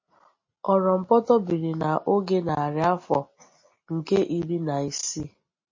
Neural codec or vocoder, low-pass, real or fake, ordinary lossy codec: none; 7.2 kHz; real; MP3, 32 kbps